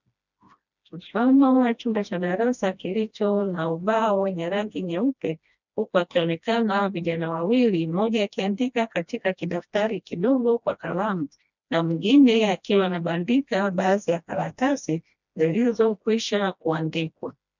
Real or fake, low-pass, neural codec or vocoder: fake; 7.2 kHz; codec, 16 kHz, 1 kbps, FreqCodec, smaller model